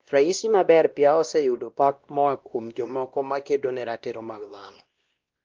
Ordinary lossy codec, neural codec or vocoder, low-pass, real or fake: Opus, 32 kbps; codec, 16 kHz, 1 kbps, X-Codec, WavLM features, trained on Multilingual LibriSpeech; 7.2 kHz; fake